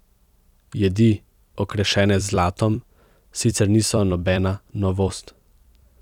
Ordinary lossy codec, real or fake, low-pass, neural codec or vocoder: none; fake; 19.8 kHz; vocoder, 44.1 kHz, 128 mel bands every 256 samples, BigVGAN v2